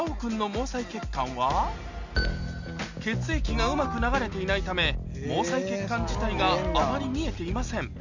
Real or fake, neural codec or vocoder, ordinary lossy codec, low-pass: real; none; none; 7.2 kHz